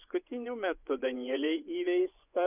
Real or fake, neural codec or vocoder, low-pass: fake; vocoder, 24 kHz, 100 mel bands, Vocos; 3.6 kHz